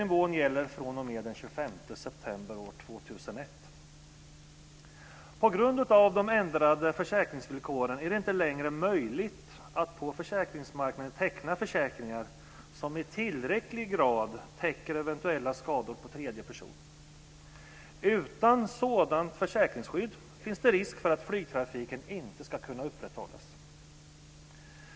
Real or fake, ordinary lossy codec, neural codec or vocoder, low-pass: real; none; none; none